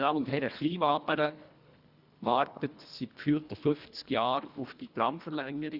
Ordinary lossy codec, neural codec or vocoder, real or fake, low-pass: Opus, 64 kbps; codec, 24 kHz, 1.5 kbps, HILCodec; fake; 5.4 kHz